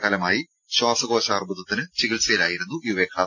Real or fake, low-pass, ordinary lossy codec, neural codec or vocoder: real; 7.2 kHz; none; none